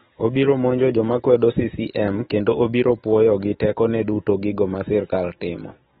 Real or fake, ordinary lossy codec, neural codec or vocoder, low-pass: fake; AAC, 16 kbps; autoencoder, 48 kHz, 128 numbers a frame, DAC-VAE, trained on Japanese speech; 19.8 kHz